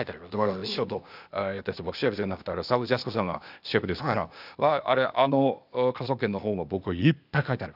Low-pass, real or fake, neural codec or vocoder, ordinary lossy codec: 5.4 kHz; fake; codec, 16 kHz, 0.8 kbps, ZipCodec; none